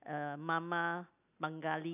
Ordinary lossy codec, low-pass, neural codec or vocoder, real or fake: none; 3.6 kHz; none; real